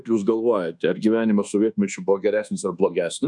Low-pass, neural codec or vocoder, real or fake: 10.8 kHz; codec, 24 kHz, 1.2 kbps, DualCodec; fake